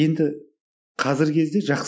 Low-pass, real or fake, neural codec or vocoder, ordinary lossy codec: none; real; none; none